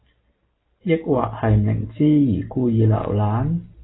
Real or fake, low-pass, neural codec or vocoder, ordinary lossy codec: real; 7.2 kHz; none; AAC, 16 kbps